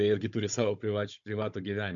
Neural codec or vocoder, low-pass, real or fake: codec, 16 kHz, 16 kbps, FunCodec, trained on Chinese and English, 50 frames a second; 7.2 kHz; fake